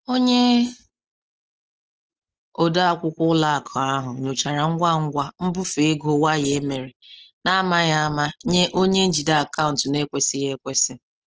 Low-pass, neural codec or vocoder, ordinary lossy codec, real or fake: 7.2 kHz; none; Opus, 16 kbps; real